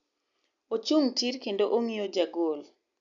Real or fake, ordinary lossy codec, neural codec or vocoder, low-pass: real; none; none; 7.2 kHz